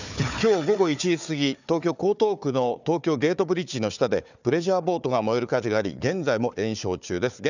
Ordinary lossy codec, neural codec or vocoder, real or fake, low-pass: none; codec, 16 kHz, 4 kbps, FunCodec, trained on Chinese and English, 50 frames a second; fake; 7.2 kHz